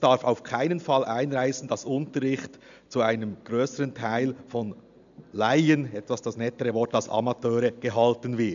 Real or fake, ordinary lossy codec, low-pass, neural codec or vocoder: real; none; 7.2 kHz; none